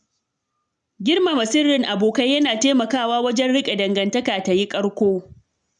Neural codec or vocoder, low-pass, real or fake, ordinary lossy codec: none; 9.9 kHz; real; none